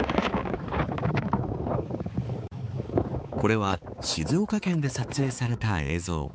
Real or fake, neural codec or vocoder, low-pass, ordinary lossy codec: fake; codec, 16 kHz, 4 kbps, X-Codec, HuBERT features, trained on balanced general audio; none; none